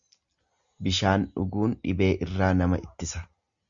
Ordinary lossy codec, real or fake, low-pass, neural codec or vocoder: Opus, 64 kbps; real; 7.2 kHz; none